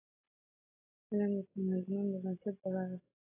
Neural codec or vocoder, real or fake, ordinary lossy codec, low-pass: none; real; AAC, 16 kbps; 3.6 kHz